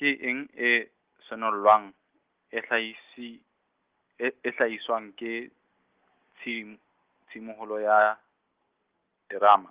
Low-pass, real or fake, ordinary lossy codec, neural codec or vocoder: 3.6 kHz; real; Opus, 16 kbps; none